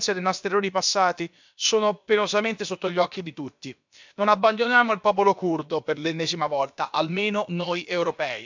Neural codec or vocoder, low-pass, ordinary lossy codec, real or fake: codec, 16 kHz, about 1 kbps, DyCAST, with the encoder's durations; 7.2 kHz; MP3, 64 kbps; fake